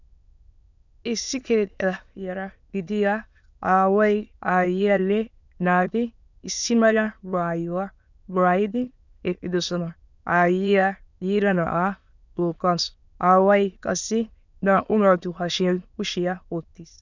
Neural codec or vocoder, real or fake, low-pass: autoencoder, 22.05 kHz, a latent of 192 numbers a frame, VITS, trained on many speakers; fake; 7.2 kHz